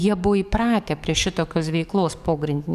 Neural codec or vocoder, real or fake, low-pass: codec, 44.1 kHz, 7.8 kbps, DAC; fake; 14.4 kHz